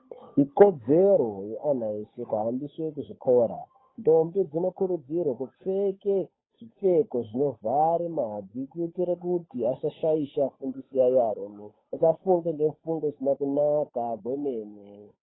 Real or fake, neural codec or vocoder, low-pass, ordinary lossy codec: fake; codec, 16 kHz, 8 kbps, FunCodec, trained on Chinese and English, 25 frames a second; 7.2 kHz; AAC, 16 kbps